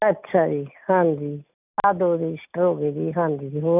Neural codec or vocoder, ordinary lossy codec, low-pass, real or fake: none; none; 3.6 kHz; real